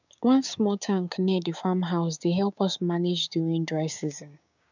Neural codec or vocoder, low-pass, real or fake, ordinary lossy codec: codec, 16 kHz, 6 kbps, DAC; 7.2 kHz; fake; none